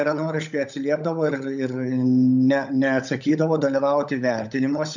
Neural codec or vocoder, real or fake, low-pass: codec, 16 kHz, 16 kbps, FunCodec, trained on LibriTTS, 50 frames a second; fake; 7.2 kHz